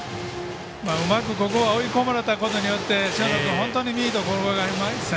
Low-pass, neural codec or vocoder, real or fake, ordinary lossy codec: none; none; real; none